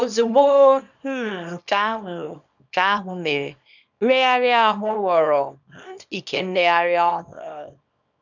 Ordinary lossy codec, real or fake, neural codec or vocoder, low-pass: none; fake; codec, 24 kHz, 0.9 kbps, WavTokenizer, small release; 7.2 kHz